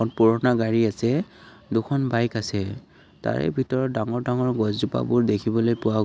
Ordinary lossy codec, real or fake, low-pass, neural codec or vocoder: none; real; none; none